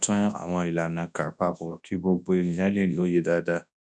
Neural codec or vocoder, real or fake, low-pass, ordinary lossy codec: codec, 24 kHz, 0.9 kbps, WavTokenizer, large speech release; fake; 10.8 kHz; none